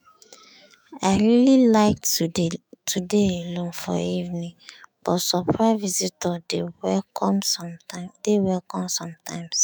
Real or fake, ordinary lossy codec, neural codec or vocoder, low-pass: fake; none; autoencoder, 48 kHz, 128 numbers a frame, DAC-VAE, trained on Japanese speech; none